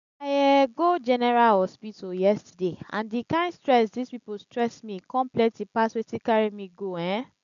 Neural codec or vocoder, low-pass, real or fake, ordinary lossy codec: none; 7.2 kHz; real; none